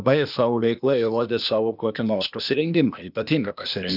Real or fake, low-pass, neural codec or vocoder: fake; 5.4 kHz; codec, 16 kHz, 0.8 kbps, ZipCodec